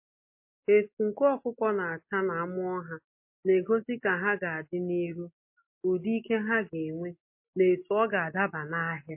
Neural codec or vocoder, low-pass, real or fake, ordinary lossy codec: none; 3.6 kHz; real; MP3, 24 kbps